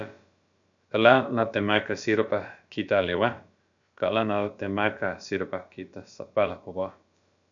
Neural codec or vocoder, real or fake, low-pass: codec, 16 kHz, about 1 kbps, DyCAST, with the encoder's durations; fake; 7.2 kHz